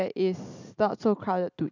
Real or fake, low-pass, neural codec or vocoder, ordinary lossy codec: real; 7.2 kHz; none; none